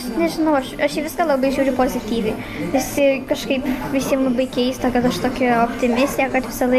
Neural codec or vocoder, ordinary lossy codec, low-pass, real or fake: none; MP3, 64 kbps; 14.4 kHz; real